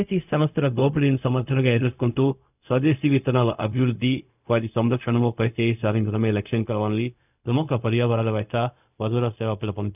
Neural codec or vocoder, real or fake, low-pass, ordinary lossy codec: codec, 16 kHz, 0.4 kbps, LongCat-Audio-Codec; fake; 3.6 kHz; none